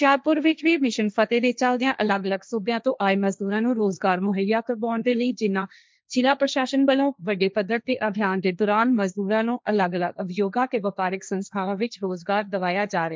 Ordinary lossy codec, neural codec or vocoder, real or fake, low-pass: none; codec, 16 kHz, 1.1 kbps, Voila-Tokenizer; fake; none